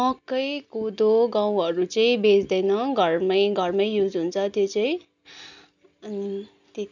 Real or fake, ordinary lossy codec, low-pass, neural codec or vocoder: real; none; 7.2 kHz; none